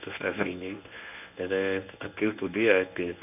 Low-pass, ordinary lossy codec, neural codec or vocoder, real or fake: 3.6 kHz; none; codec, 24 kHz, 0.9 kbps, WavTokenizer, medium speech release version 1; fake